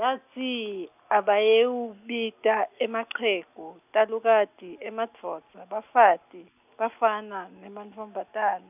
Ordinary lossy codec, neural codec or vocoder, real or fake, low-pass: none; none; real; 3.6 kHz